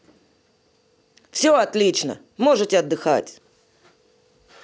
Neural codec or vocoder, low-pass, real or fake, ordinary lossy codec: none; none; real; none